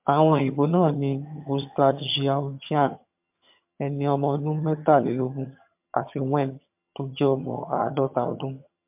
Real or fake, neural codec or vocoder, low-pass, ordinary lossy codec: fake; vocoder, 22.05 kHz, 80 mel bands, HiFi-GAN; 3.6 kHz; MP3, 32 kbps